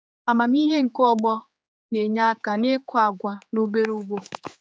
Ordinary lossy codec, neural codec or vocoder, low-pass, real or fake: none; codec, 16 kHz, 4 kbps, X-Codec, HuBERT features, trained on general audio; none; fake